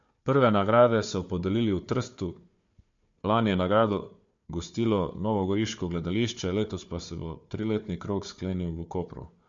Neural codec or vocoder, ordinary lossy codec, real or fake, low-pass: codec, 16 kHz, 4 kbps, FunCodec, trained on Chinese and English, 50 frames a second; MP3, 48 kbps; fake; 7.2 kHz